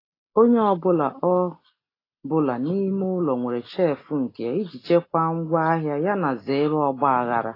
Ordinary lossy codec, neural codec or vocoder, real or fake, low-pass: AAC, 24 kbps; none; real; 5.4 kHz